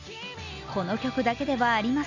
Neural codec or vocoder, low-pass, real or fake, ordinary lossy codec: none; 7.2 kHz; real; none